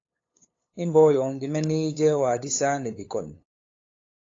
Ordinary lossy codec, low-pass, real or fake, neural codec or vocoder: AAC, 32 kbps; 7.2 kHz; fake; codec, 16 kHz, 8 kbps, FunCodec, trained on LibriTTS, 25 frames a second